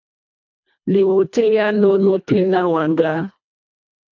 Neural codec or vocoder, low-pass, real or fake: codec, 24 kHz, 1.5 kbps, HILCodec; 7.2 kHz; fake